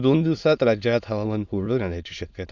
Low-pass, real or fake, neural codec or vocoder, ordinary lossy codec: 7.2 kHz; fake; autoencoder, 22.05 kHz, a latent of 192 numbers a frame, VITS, trained on many speakers; none